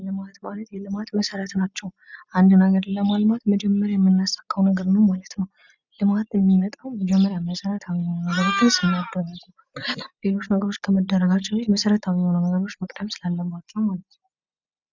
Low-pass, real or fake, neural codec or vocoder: 7.2 kHz; real; none